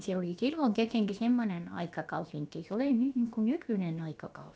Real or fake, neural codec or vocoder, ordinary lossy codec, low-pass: fake; codec, 16 kHz, about 1 kbps, DyCAST, with the encoder's durations; none; none